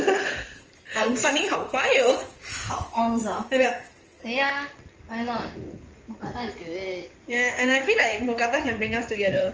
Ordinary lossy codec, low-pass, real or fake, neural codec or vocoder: Opus, 24 kbps; 7.2 kHz; fake; codec, 16 kHz in and 24 kHz out, 2.2 kbps, FireRedTTS-2 codec